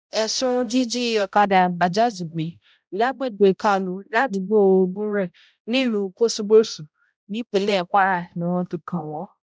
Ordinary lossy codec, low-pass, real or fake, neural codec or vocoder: none; none; fake; codec, 16 kHz, 0.5 kbps, X-Codec, HuBERT features, trained on balanced general audio